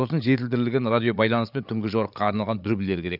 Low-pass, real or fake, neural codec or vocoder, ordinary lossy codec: 5.4 kHz; fake; codec, 16 kHz, 8 kbps, FreqCodec, larger model; none